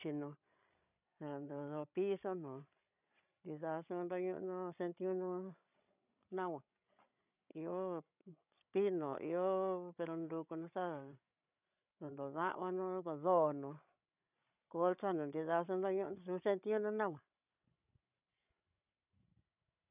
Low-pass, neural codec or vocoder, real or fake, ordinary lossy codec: 3.6 kHz; codec, 16 kHz, 16 kbps, FreqCodec, larger model; fake; none